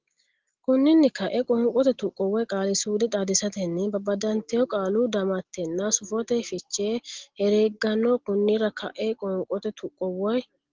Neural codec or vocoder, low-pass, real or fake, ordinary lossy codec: none; 7.2 kHz; real; Opus, 16 kbps